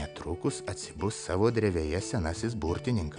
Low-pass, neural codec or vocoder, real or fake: 9.9 kHz; none; real